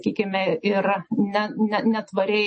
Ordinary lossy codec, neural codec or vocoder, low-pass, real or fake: MP3, 32 kbps; none; 10.8 kHz; real